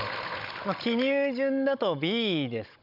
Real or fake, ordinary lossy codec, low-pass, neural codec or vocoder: fake; none; 5.4 kHz; codec, 16 kHz, 16 kbps, FunCodec, trained on Chinese and English, 50 frames a second